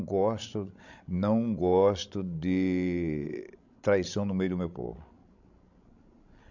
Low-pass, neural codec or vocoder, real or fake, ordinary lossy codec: 7.2 kHz; codec, 16 kHz, 16 kbps, FreqCodec, larger model; fake; none